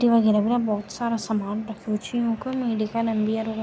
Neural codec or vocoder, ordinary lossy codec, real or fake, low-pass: none; none; real; none